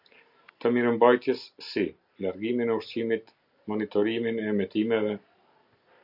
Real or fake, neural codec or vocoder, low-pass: real; none; 5.4 kHz